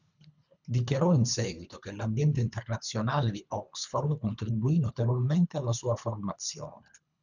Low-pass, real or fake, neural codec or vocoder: 7.2 kHz; fake; codec, 24 kHz, 3 kbps, HILCodec